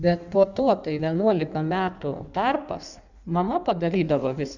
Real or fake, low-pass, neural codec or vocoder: fake; 7.2 kHz; codec, 16 kHz in and 24 kHz out, 1.1 kbps, FireRedTTS-2 codec